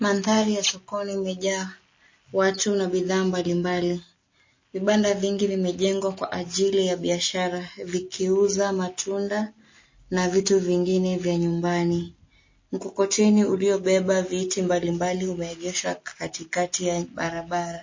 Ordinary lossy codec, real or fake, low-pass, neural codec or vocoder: MP3, 32 kbps; real; 7.2 kHz; none